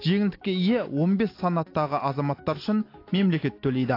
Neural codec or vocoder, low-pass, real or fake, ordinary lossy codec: none; 5.4 kHz; real; AAC, 32 kbps